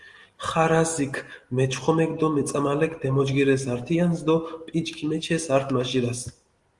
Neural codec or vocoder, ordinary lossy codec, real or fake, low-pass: none; Opus, 24 kbps; real; 10.8 kHz